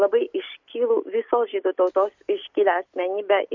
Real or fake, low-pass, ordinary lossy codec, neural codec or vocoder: real; 7.2 kHz; MP3, 48 kbps; none